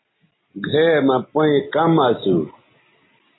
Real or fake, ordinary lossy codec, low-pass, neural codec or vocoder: real; AAC, 16 kbps; 7.2 kHz; none